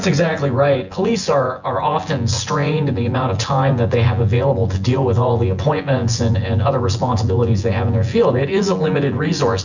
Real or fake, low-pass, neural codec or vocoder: fake; 7.2 kHz; vocoder, 24 kHz, 100 mel bands, Vocos